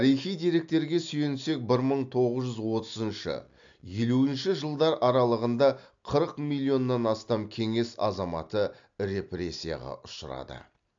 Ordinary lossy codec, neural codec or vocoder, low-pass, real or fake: AAC, 64 kbps; none; 7.2 kHz; real